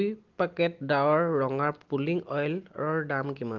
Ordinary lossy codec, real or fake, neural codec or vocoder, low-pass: Opus, 16 kbps; real; none; 7.2 kHz